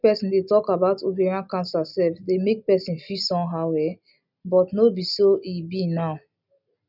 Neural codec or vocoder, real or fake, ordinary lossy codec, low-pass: none; real; none; 5.4 kHz